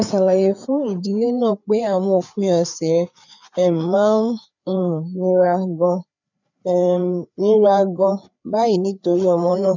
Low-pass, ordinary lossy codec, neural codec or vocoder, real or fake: 7.2 kHz; none; codec, 16 kHz, 4 kbps, FreqCodec, larger model; fake